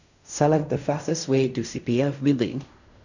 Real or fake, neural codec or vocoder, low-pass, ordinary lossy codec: fake; codec, 16 kHz in and 24 kHz out, 0.4 kbps, LongCat-Audio-Codec, fine tuned four codebook decoder; 7.2 kHz; none